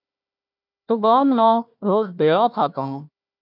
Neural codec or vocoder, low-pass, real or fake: codec, 16 kHz, 1 kbps, FunCodec, trained on Chinese and English, 50 frames a second; 5.4 kHz; fake